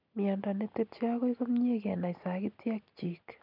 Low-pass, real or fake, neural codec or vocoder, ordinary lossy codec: 5.4 kHz; real; none; none